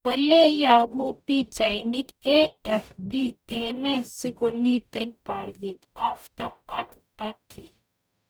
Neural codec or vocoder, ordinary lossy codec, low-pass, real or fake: codec, 44.1 kHz, 0.9 kbps, DAC; none; none; fake